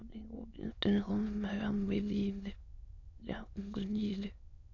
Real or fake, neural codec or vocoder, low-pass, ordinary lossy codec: fake; autoencoder, 22.05 kHz, a latent of 192 numbers a frame, VITS, trained on many speakers; 7.2 kHz; none